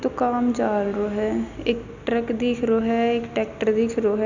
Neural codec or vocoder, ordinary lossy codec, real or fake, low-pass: none; none; real; 7.2 kHz